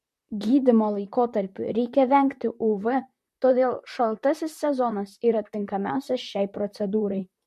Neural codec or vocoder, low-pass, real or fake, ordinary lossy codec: vocoder, 44.1 kHz, 128 mel bands, Pupu-Vocoder; 14.4 kHz; fake; MP3, 64 kbps